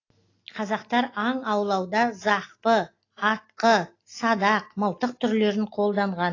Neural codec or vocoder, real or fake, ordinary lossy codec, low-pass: none; real; AAC, 32 kbps; 7.2 kHz